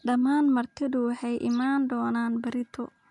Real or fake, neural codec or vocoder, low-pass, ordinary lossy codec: real; none; 10.8 kHz; none